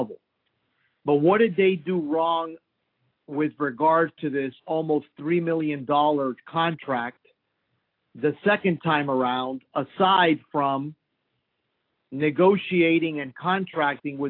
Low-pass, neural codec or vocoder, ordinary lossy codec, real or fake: 5.4 kHz; none; AAC, 32 kbps; real